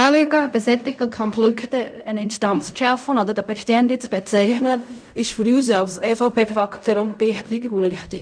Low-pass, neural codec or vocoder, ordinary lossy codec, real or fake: 9.9 kHz; codec, 16 kHz in and 24 kHz out, 0.4 kbps, LongCat-Audio-Codec, fine tuned four codebook decoder; none; fake